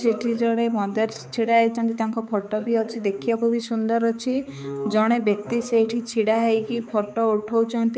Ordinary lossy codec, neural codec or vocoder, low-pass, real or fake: none; codec, 16 kHz, 4 kbps, X-Codec, HuBERT features, trained on balanced general audio; none; fake